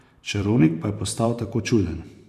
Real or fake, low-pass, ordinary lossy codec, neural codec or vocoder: fake; 14.4 kHz; none; vocoder, 44.1 kHz, 128 mel bands every 512 samples, BigVGAN v2